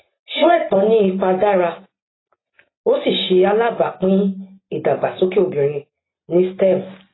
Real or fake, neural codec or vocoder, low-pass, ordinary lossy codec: real; none; 7.2 kHz; AAC, 16 kbps